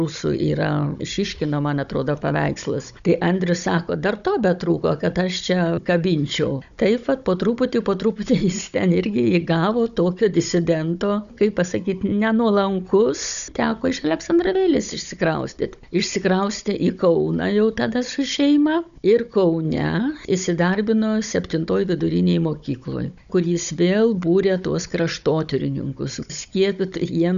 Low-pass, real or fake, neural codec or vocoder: 7.2 kHz; fake; codec, 16 kHz, 16 kbps, FunCodec, trained on Chinese and English, 50 frames a second